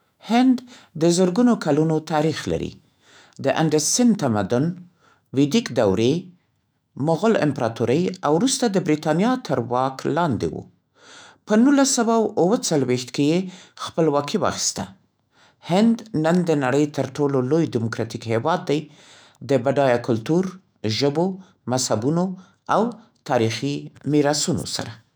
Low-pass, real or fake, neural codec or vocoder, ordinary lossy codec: none; fake; autoencoder, 48 kHz, 128 numbers a frame, DAC-VAE, trained on Japanese speech; none